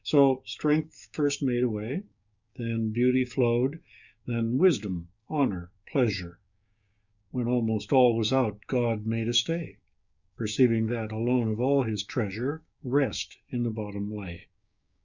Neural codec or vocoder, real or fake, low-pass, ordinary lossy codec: codec, 16 kHz, 6 kbps, DAC; fake; 7.2 kHz; Opus, 64 kbps